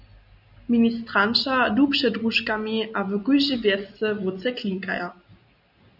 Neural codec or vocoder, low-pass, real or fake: none; 5.4 kHz; real